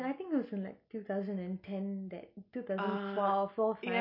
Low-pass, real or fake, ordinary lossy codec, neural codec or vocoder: 5.4 kHz; real; none; none